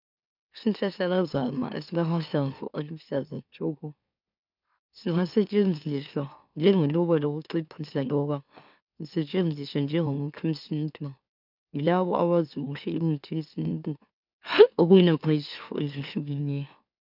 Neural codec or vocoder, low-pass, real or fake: autoencoder, 44.1 kHz, a latent of 192 numbers a frame, MeloTTS; 5.4 kHz; fake